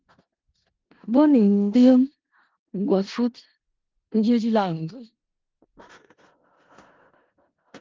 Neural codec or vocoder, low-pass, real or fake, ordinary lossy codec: codec, 16 kHz in and 24 kHz out, 0.4 kbps, LongCat-Audio-Codec, four codebook decoder; 7.2 kHz; fake; Opus, 16 kbps